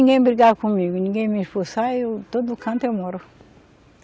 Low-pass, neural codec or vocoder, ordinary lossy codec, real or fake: none; none; none; real